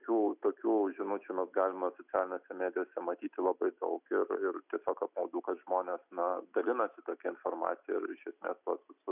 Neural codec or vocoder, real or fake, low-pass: none; real; 3.6 kHz